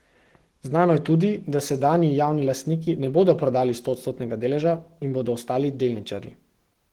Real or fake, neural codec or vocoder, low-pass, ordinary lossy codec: fake; codec, 44.1 kHz, 7.8 kbps, Pupu-Codec; 19.8 kHz; Opus, 16 kbps